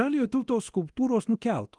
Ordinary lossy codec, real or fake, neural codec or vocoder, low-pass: Opus, 24 kbps; fake; codec, 24 kHz, 0.9 kbps, DualCodec; 10.8 kHz